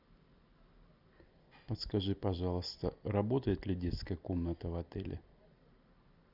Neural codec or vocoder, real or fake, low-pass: none; real; 5.4 kHz